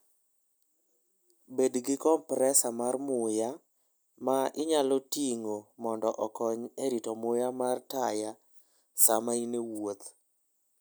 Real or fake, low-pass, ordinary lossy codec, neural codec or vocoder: real; none; none; none